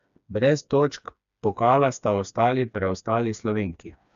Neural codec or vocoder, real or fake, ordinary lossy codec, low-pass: codec, 16 kHz, 2 kbps, FreqCodec, smaller model; fake; AAC, 96 kbps; 7.2 kHz